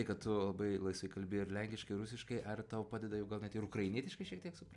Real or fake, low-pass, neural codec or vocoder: real; 10.8 kHz; none